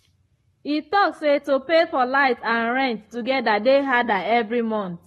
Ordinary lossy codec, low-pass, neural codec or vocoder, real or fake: AAC, 32 kbps; 19.8 kHz; codec, 44.1 kHz, 7.8 kbps, Pupu-Codec; fake